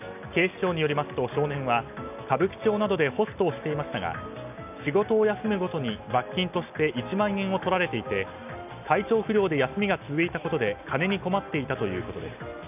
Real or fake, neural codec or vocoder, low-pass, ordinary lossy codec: real; none; 3.6 kHz; none